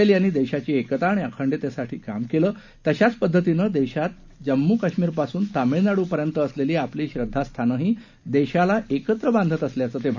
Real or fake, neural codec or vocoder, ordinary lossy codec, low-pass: real; none; none; 7.2 kHz